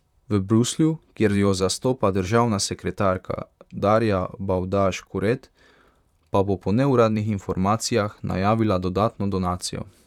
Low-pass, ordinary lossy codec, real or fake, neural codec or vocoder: 19.8 kHz; none; fake; vocoder, 44.1 kHz, 128 mel bands, Pupu-Vocoder